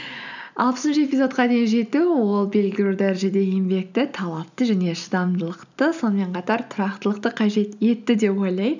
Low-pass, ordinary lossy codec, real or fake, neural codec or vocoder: 7.2 kHz; none; real; none